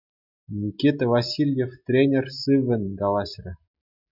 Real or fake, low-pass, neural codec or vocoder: real; 5.4 kHz; none